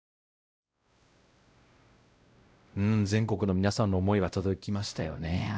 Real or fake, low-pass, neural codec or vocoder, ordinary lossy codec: fake; none; codec, 16 kHz, 0.5 kbps, X-Codec, WavLM features, trained on Multilingual LibriSpeech; none